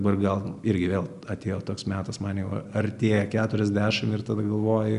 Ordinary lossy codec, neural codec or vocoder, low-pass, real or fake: Opus, 64 kbps; none; 10.8 kHz; real